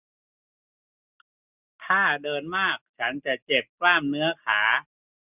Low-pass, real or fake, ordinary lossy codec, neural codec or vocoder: 3.6 kHz; real; none; none